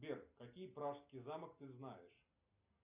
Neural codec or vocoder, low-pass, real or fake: none; 3.6 kHz; real